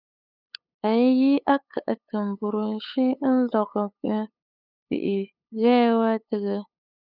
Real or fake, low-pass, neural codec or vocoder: fake; 5.4 kHz; codec, 16 kHz, 8 kbps, FunCodec, trained on LibriTTS, 25 frames a second